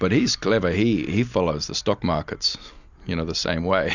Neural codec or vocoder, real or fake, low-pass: none; real; 7.2 kHz